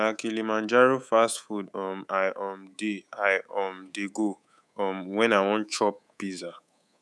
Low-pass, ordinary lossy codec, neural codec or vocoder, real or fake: none; none; codec, 24 kHz, 3.1 kbps, DualCodec; fake